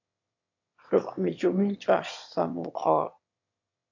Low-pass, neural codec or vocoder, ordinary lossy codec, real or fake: 7.2 kHz; autoencoder, 22.05 kHz, a latent of 192 numbers a frame, VITS, trained on one speaker; AAC, 48 kbps; fake